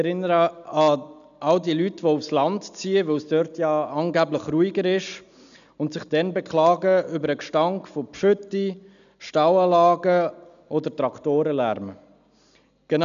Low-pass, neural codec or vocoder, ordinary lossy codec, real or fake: 7.2 kHz; none; none; real